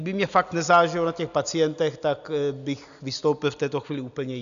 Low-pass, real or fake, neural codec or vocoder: 7.2 kHz; real; none